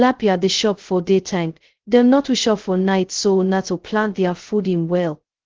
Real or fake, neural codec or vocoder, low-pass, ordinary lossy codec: fake; codec, 16 kHz, 0.2 kbps, FocalCodec; 7.2 kHz; Opus, 16 kbps